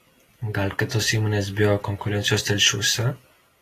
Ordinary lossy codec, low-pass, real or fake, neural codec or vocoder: AAC, 48 kbps; 14.4 kHz; real; none